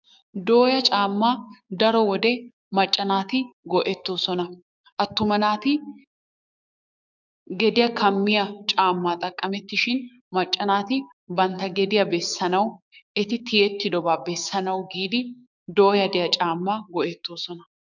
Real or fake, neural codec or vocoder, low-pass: fake; codec, 16 kHz, 6 kbps, DAC; 7.2 kHz